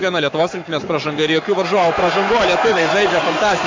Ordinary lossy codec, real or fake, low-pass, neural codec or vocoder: MP3, 64 kbps; fake; 7.2 kHz; codec, 44.1 kHz, 7.8 kbps, Pupu-Codec